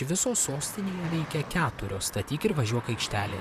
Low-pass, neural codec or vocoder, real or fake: 14.4 kHz; vocoder, 44.1 kHz, 128 mel bands, Pupu-Vocoder; fake